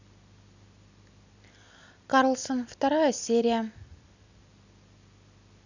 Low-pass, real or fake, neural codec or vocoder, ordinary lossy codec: 7.2 kHz; real; none; none